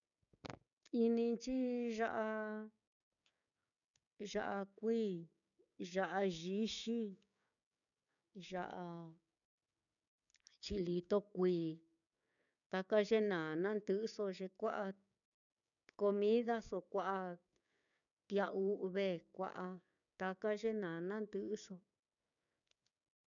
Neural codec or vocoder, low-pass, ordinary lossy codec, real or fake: codec, 16 kHz, 6 kbps, DAC; 7.2 kHz; MP3, 96 kbps; fake